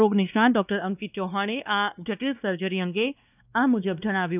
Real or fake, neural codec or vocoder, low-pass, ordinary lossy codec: fake; codec, 16 kHz, 2 kbps, X-Codec, WavLM features, trained on Multilingual LibriSpeech; 3.6 kHz; none